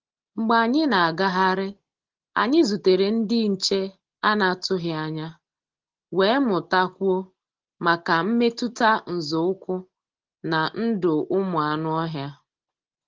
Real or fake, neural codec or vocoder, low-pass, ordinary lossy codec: real; none; 7.2 kHz; Opus, 16 kbps